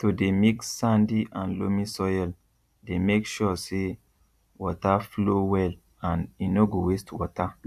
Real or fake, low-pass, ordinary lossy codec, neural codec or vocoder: fake; 14.4 kHz; AAC, 96 kbps; vocoder, 44.1 kHz, 128 mel bands every 256 samples, BigVGAN v2